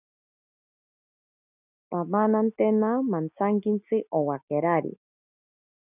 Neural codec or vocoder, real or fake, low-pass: none; real; 3.6 kHz